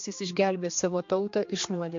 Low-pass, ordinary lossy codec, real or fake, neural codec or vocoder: 7.2 kHz; MP3, 48 kbps; fake; codec, 16 kHz, 2 kbps, X-Codec, HuBERT features, trained on general audio